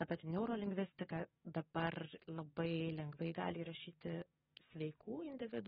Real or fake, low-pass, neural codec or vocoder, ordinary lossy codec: fake; 19.8 kHz; codec, 44.1 kHz, 7.8 kbps, DAC; AAC, 16 kbps